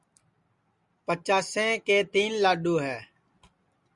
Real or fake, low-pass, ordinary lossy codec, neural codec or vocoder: real; 10.8 kHz; Opus, 64 kbps; none